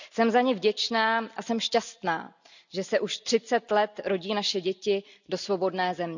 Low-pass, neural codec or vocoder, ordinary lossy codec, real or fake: 7.2 kHz; none; none; real